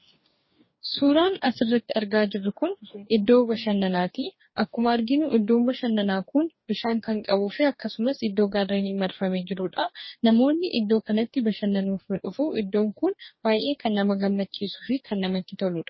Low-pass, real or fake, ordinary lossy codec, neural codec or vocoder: 7.2 kHz; fake; MP3, 24 kbps; codec, 44.1 kHz, 2.6 kbps, DAC